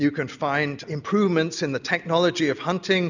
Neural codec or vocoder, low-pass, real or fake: none; 7.2 kHz; real